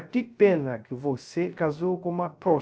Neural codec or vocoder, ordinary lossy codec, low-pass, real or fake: codec, 16 kHz, 0.3 kbps, FocalCodec; none; none; fake